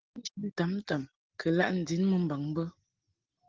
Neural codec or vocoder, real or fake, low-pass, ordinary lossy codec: none; real; 7.2 kHz; Opus, 16 kbps